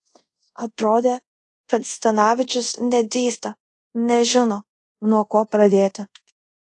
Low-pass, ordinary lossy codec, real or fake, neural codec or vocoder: 10.8 kHz; AAC, 48 kbps; fake; codec, 24 kHz, 0.5 kbps, DualCodec